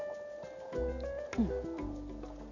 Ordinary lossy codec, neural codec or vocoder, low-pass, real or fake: none; none; 7.2 kHz; real